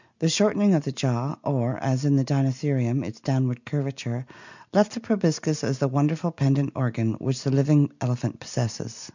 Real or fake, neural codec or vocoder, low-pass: real; none; 7.2 kHz